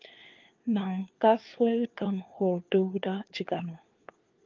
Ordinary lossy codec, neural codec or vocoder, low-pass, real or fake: Opus, 24 kbps; codec, 16 kHz, 4 kbps, FunCodec, trained on LibriTTS, 50 frames a second; 7.2 kHz; fake